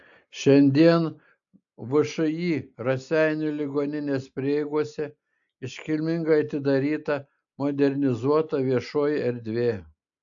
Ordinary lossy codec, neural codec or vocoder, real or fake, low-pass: AAC, 64 kbps; none; real; 7.2 kHz